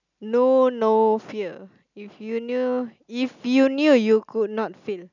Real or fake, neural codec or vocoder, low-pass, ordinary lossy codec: real; none; 7.2 kHz; none